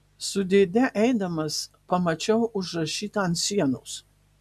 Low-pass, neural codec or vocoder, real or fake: 14.4 kHz; none; real